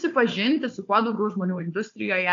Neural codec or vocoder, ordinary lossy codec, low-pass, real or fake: codec, 16 kHz, 4 kbps, FunCodec, trained on Chinese and English, 50 frames a second; AAC, 48 kbps; 7.2 kHz; fake